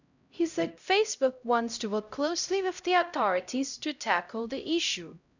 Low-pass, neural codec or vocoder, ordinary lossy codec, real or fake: 7.2 kHz; codec, 16 kHz, 0.5 kbps, X-Codec, HuBERT features, trained on LibriSpeech; none; fake